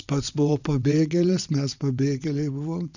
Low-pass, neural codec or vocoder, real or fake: 7.2 kHz; vocoder, 22.05 kHz, 80 mel bands, WaveNeXt; fake